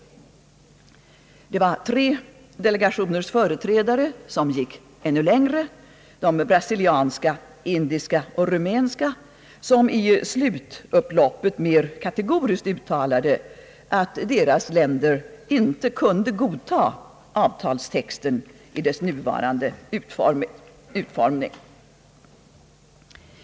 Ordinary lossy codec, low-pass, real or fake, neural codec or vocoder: none; none; real; none